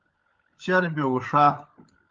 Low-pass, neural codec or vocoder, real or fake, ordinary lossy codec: 7.2 kHz; codec, 16 kHz, 16 kbps, FunCodec, trained on LibriTTS, 50 frames a second; fake; Opus, 32 kbps